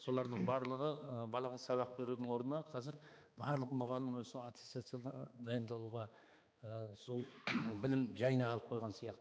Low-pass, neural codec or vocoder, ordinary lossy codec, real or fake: none; codec, 16 kHz, 2 kbps, X-Codec, HuBERT features, trained on balanced general audio; none; fake